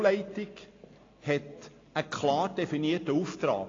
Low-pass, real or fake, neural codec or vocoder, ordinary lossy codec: 7.2 kHz; real; none; AAC, 32 kbps